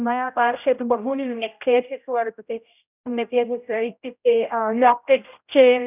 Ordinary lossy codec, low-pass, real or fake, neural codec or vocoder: none; 3.6 kHz; fake; codec, 16 kHz, 0.5 kbps, X-Codec, HuBERT features, trained on general audio